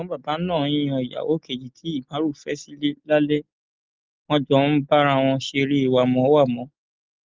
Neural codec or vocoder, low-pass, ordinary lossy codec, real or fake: none; 7.2 kHz; Opus, 32 kbps; real